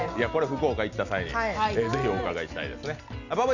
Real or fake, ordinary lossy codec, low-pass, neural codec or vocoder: real; none; 7.2 kHz; none